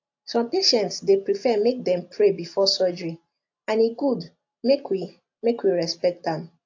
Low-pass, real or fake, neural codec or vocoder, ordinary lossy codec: 7.2 kHz; real; none; AAC, 48 kbps